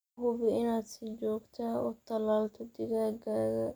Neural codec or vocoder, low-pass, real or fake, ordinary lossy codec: none; none; real; none